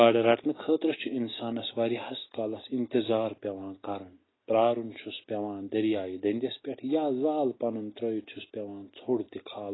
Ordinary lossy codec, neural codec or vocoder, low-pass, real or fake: AAC, 16 kbps; none; 7.2 kHz; real